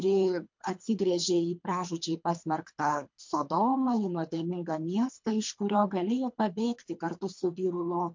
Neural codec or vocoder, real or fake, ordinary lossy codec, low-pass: codec, 24 kHz, 3 kbps, HILCodec; fake; MP3, 48 kbps; 7.2 kHz